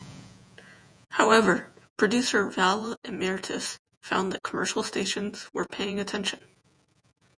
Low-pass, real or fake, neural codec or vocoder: 9.9 kHz; fake; vocoder, 48 kHz, 128 mel bands, Vocos